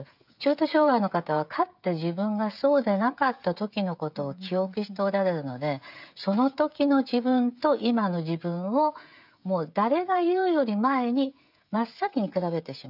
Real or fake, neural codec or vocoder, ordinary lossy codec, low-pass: fake; codec, 16 kHz, 16 kbps, FreqCodec, smaller model; none; 5.4 kHz